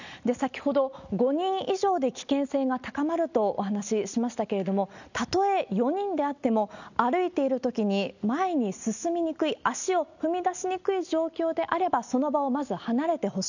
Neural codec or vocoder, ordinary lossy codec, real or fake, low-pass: none; none; real; 7.2 kHz